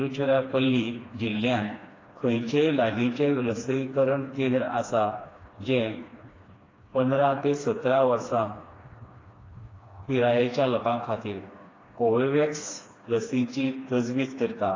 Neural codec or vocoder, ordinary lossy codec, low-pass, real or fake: codec, 16 kHz, 2 kbps, FreqCodec, smaller model; AAC, 32 kbps; 7.2 kHz; fake